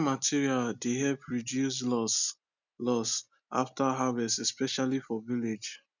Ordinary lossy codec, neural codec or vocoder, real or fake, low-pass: none; none; real; 7.2 kHz